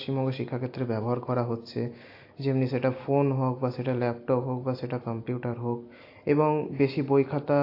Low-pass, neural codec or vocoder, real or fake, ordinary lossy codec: 5.4 kHz; none; real; AAC, 32 kbps